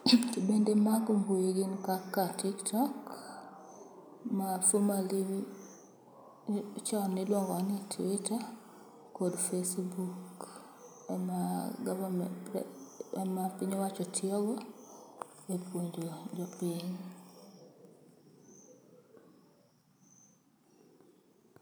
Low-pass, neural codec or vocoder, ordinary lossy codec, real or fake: none; none; none; real